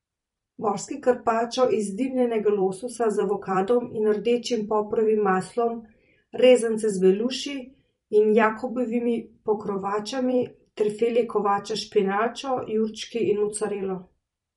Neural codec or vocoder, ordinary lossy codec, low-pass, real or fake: vocoder, 44.1 kHz, 128 mel bands every 256 samples, BigVGAN v2; MP3, 48 kbps; 19.8 kHz; fake